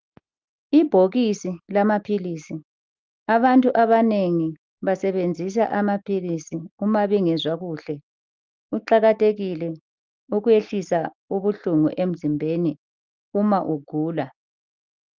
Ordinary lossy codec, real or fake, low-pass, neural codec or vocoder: Opus, 24 kbps; real; 7.2 kHz; none